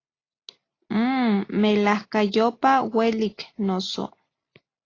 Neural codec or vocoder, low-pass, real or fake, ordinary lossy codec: none; 7.2 kHz; real; AAC, 32 kbps